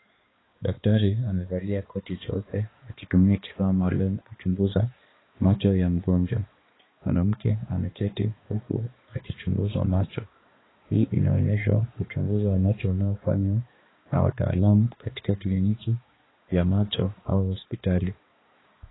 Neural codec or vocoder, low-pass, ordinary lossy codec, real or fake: codec, 16 kHz, 2 kbps, X-Codec, HuBERT features, trained on balanced general audio; 7.2 kHz; AAC, 16 kbps; fake